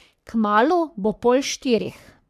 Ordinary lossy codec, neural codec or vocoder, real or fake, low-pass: none; codec, 44.1 kHz, 3.4 kbps, Pupu-Codec; fake; 14.4 kHz